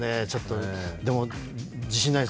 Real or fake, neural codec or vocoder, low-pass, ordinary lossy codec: real; none; none; none